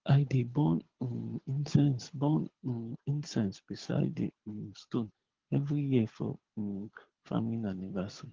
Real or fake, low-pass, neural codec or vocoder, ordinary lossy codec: fake; 7.2 kHz; codec, 24 kHz, 6 kbps, HILCodec; Opus, 16 kbps